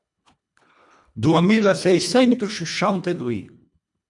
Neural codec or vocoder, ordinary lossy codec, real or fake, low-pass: codec, 24 kHz, 1.5 kbps, HILCodec; MP3, 96 kbps; fake; 10.8 kHz